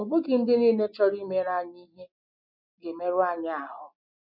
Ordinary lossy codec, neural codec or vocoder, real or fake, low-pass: none; none; real; 5.4 kHz